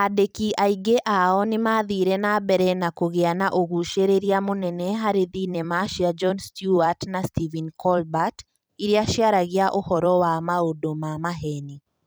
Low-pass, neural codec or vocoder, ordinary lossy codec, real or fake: none; vocoder, 44.1 kHz, 128 mel bands every 256 samples, BigVGAN v2; none; fake